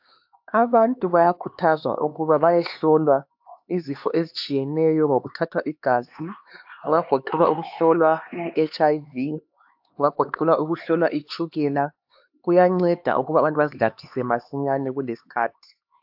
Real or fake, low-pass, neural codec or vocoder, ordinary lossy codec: fake; 5.4 kHz; codec, 16 kHz, 2 kbps, X-Codec, HuBERT features, trained on LibriSpeech; AAC, 48 kbps